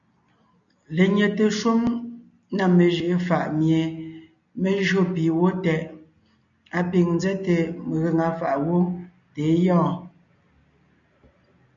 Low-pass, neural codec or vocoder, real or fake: 7.2 kHz; none; real